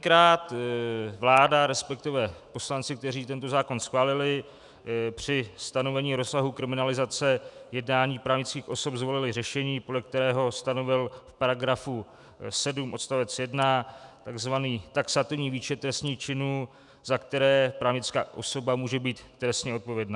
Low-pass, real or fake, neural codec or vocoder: 10.8 kHz; fake; autoencoder, 48 kHz, 128 numbers a frame, DAC-VAE, trained on Japanese speech